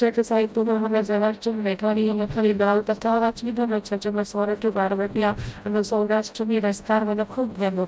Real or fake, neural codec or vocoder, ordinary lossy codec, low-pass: fake; codec, 16 kHz, 0.5 kbps, FreqCodec, smaller model; none; none